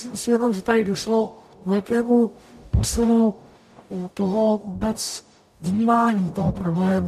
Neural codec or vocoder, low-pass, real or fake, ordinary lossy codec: codec, 44.1 kHz, 0.9 kbps, DAC; 14.4 kHz; fake; MP3, 96 kbps